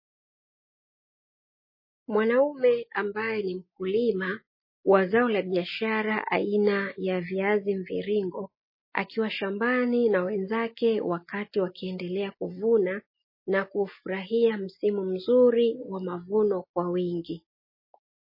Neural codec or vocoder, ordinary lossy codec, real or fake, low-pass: none; MP3, 24 kbps; real; 5.4 kHz